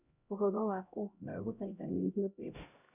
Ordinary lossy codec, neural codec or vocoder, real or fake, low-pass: none; codec, 16 kHz, 0.5 kbps, X-Codec, HuBERT features, trained on LibriSpeech; fake; 3.6 kHz